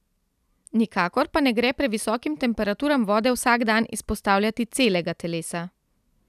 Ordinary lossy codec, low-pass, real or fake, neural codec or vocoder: none; 14.4 kHz; real; none